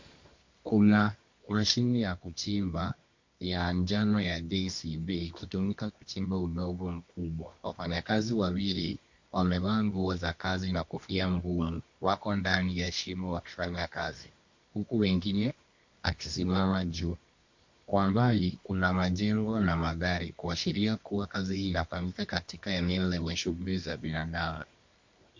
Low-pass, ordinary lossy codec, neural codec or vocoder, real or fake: 7.2 kHz; MP3, 48 kbps; codec, 24 kHz, 0.9 kbps, WavTokenizer, medium music audio release; fake